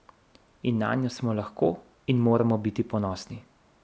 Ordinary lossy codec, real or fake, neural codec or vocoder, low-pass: none; real; none; none